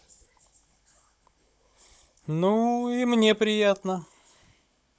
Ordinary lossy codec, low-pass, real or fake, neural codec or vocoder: none; none; fake; codec, 16 kHz, 16 kbps, FunCodec, trained on Chinese and English, 50 frames a second